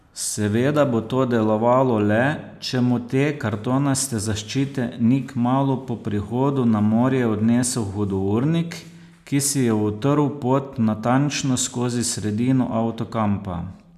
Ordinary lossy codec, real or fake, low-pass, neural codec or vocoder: none; real; 14.4 kHz; none